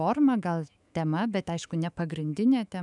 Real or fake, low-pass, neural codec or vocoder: fake; 10.8 kHz; autoencoder, 48 kHz, 128 numbers a frame, DAC-VAE, trained on Japanese speech